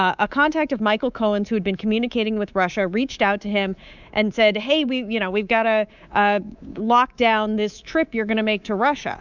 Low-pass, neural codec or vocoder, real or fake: 7.2 kHz; codec, 24 kHz, 3.1 kbps, DualCodec; fake